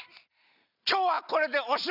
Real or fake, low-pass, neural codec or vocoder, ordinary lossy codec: real; 5.4 kHz; none; none